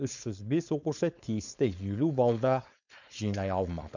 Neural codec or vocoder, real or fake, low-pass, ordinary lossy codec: codec, 16 kHz, 4.8 kbps, FACodec; fake; 7.2 kHz; none